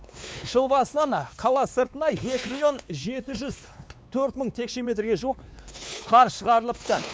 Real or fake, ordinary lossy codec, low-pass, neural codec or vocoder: fake; none; none; codec, 16 kHz, 2 kbps, X-Codec, WavLM features, trained on Multilingual LibriSpeech